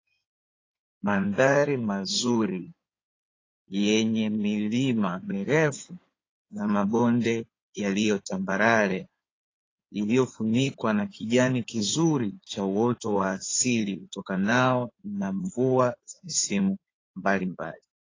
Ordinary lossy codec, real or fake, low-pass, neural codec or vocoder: AAC, 32 kbps; fake; 7.2 kHz; codec, 16 kHz in and 24 kHz out, 2.2 kbps, FireRedTTS-2 codec